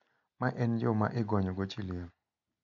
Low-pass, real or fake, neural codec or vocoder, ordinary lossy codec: 7.2 kHz; real; none; none